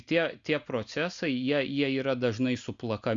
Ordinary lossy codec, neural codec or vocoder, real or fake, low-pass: Opus, 64 kbps; none; real; 7.2 kHz